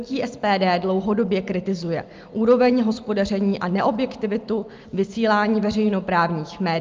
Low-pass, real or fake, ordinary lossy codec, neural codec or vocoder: 7.2 kHz; real; Opus, 32 kbps; none